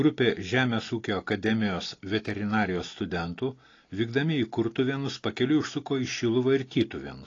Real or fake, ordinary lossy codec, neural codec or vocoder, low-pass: real; AAC, 32 kbps; none; 7.2 kHz